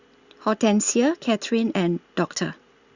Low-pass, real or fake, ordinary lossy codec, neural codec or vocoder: 7.2 kHz; real; Opus, 64 kbps; none